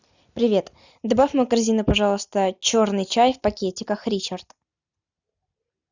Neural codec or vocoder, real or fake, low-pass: none; real; 7.2 kHz